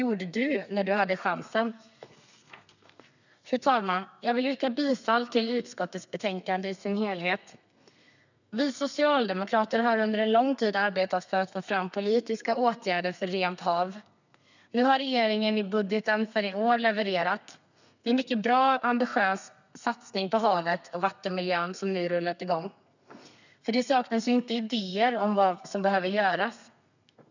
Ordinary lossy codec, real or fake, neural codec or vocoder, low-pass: none; fake; codec, 32 kHz, 1.9 kbps, SNAC; 7.2 kHz